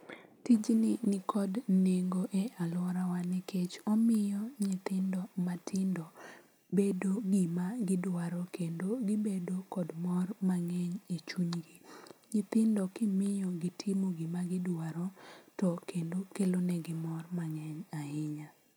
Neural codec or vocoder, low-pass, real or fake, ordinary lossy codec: none; none; real; none